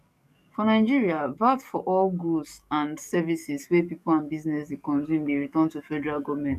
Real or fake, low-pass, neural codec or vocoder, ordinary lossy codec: fake; 14.4 kHz; codec, 44.1 kHz, 7.8 kbps, DAC; none